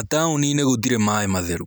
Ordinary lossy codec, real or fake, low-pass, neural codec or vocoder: none; real; none; none